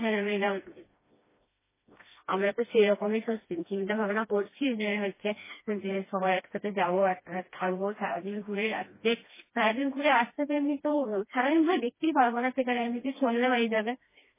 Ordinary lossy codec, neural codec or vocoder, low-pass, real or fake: MP3, 16 kbps; codec, 16 kHz, 1 kbps, FreqCodec, smaller model; 3.6 kHz; fake